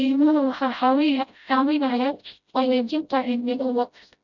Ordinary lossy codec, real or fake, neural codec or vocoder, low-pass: none; fake; codec, 16 kHz, 0.5 kbps, FreqCodec, smaller model; 7.2 kHz